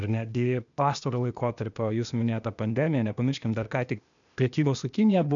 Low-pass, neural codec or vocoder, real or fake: 7.2 kHz; codec, 16 kHz, 0.8 kbps, ZipCodec; fake